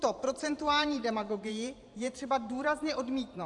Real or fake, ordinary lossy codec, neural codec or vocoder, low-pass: fake; AAC, 64 kbps; vocoder, 44.1 kHz, 128 mel bands every 512 samples, BigVGAN v2; 10.8 kHz